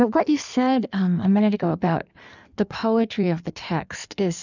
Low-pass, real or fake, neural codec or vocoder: 7.2 kHz; fake; codec, 16 kHz in and 24 kHz out, 1.1 kbps, FireRedTTS-2 codec